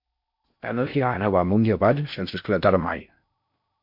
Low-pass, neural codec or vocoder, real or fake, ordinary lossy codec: 5.4 kHz; codec, 16 kHz in and 24 kHz out, 0.6 kbps, FocalCodec, streaming, 4096 codes; fake; MP3, 48 kbps